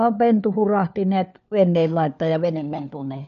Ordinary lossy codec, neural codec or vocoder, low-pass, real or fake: none; codec, 16 kHz, 4 kbps, FunCodec, trained on LibriTTS, 50 frames a second; 7.2 kHz; fake